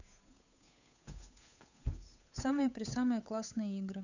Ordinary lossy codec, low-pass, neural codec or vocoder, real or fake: none; 7.2 kHz; codec, 16 kHz, 8 kbps, FunCodec, trained on Chinese and English, 25 frames a second; fake